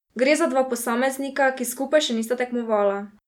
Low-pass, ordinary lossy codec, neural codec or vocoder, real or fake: 19.8 kHz; none; none; real